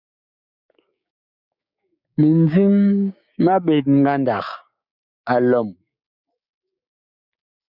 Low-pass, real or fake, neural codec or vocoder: 5.4 kHz; fake; codec, 16 kHz, 6 kbps, DAC